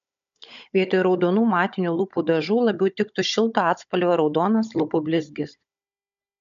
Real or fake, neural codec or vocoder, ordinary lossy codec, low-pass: fake; codec, 16 kHz, 16 kbps, FunCodec, trained on Chinese and English, 50 frames a second; MP3, 64 kbps; 7.2 kHz